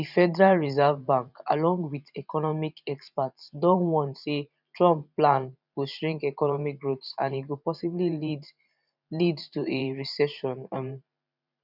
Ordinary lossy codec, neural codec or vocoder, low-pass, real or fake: none; vocoder, 44.1 kHz, 128 mel bands every 512 samples, BigVGAN v2; 5.4 kHz; fake